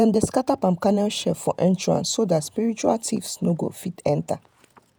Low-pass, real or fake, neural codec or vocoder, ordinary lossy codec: none; fake; vocoder, 48 kHz, 128 mel bands, Vocos; none